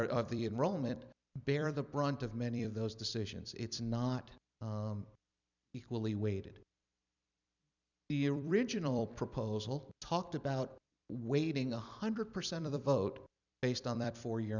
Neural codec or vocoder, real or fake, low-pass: vocoder, 44.1 kHz, 128 mel bands every 256 samples, BigVGAN v2; fake; 7.2 kHz